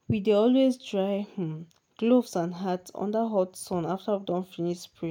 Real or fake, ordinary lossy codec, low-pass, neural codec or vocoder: real; none; 19.8 kHz; none